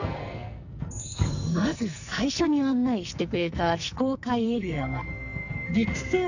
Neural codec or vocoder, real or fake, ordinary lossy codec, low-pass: codec, 44.1 kHz, 2.6 kbps, SNAC; fake; none; 7.2 kHz